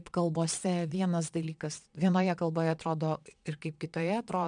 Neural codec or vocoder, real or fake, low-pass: vocoder, 22.05 kHz, 80 mel bands, Vocos; fake; 9.9 kHz